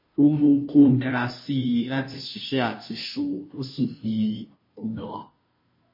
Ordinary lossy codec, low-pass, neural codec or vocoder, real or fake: MP3, 24 kbps; 5.4 kHz; codec, 16 kHz, 0.5 kbps, FunCodec, trained on Chinese and English, 25 frames a second; fake